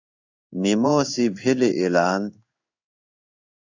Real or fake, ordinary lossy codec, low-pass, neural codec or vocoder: fake; AAC, 48 kbps; 7.2 kHz; codec, 16 kHz in and 24 kHz out, 1 kbps, XY-Tokenizer